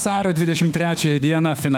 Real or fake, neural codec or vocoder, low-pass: fake; autoencoder, 48 kHz, 32 numbers a frame, DAC-VAE, trained on Japanese speech; 19.8 kHz